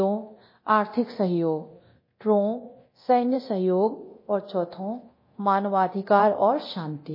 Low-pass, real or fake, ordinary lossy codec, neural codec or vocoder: 5.4 kHz; fake; MP3, 24 kbps; codec, 24 kHz, 0.9 kbps, DualCodec